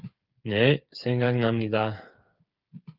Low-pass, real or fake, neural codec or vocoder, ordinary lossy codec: 5.4 kHz; fake; codec, 16 kHz, 8 kbps, FreqCodec, smaller model; Opus, 32 kbps